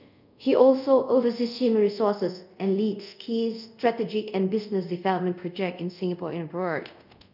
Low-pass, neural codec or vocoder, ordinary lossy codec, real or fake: 5.4 kHz; codec, 24 kHz, 0.5 kbps, DualCodec; none; fake